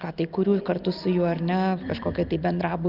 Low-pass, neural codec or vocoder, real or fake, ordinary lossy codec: 5.4 kHz; none; real; Opus, 24 kbps